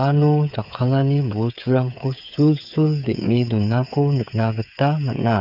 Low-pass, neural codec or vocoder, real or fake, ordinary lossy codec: 5.4 kHz; codec, 16 kHz, 8 kbps, FreqCodec, smaller model; fake; none